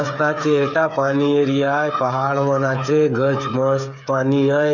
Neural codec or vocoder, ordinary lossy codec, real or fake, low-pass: codec, 16 kHz, 8 kbps, FreqCodec, smaller model; none; fake; 7.2 kHz